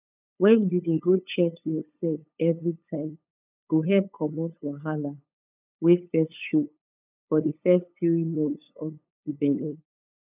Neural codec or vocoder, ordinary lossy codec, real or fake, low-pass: codec, 16 kHz, 8 kbps, FunCodec, trained on LibriTTS, 25 frames a second; none; fake; 3.6 kHz